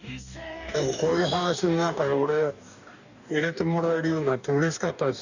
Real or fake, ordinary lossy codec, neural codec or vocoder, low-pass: fake; none; codec, 44.1 kHz, 2.6 kbps, DAC; 7.2 kHz